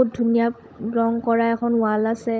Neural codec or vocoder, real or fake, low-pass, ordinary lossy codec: codec, 16 kHz, 16 kbps, FunCodec, trained on LibriTTS, 50 frames a second; fake; none; none